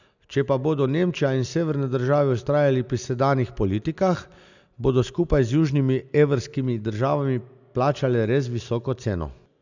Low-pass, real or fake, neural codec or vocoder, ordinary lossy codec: 7.2 kHz; real; none; none